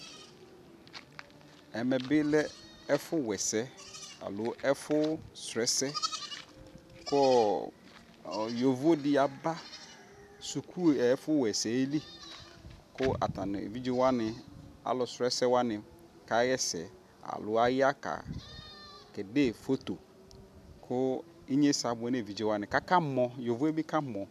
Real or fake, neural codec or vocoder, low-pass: real; none; 14.4 kHz